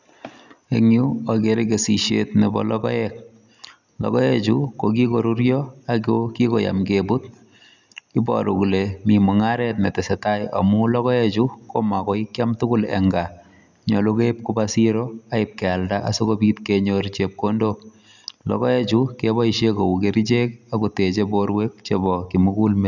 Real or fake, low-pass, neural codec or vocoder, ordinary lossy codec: real; 7.2 kHz; none; none